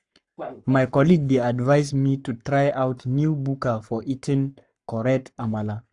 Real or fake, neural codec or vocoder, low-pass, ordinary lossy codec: fake; codec, 44.1 kHz, 7.8 kbps, Pupu-Codec; 10.8 kHz; none